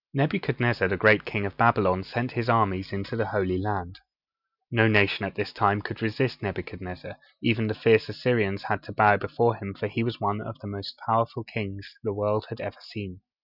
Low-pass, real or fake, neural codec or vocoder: 5.4 kHz; real; none